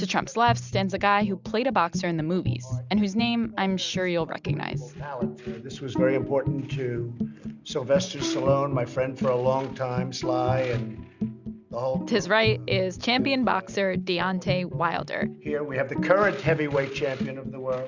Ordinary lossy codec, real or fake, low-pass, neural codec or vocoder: Opus, 64 kbps; real; 7.2 kHz; none